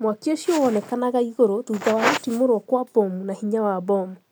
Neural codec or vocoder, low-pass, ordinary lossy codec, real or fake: none; none; none; real